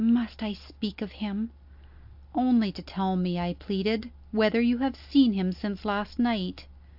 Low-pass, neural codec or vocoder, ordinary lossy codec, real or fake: 5.4 kHz; none; AAC, 48 kbps; real